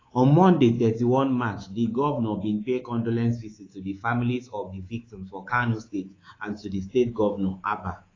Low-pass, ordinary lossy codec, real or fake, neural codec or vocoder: 7.2 kHz; AAC, 32 kbps; fake; codec, 24 kHz, 3.1 kbps, DualCodec